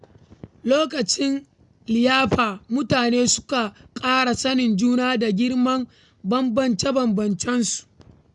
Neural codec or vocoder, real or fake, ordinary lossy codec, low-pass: none; real; none; 10.8 kHz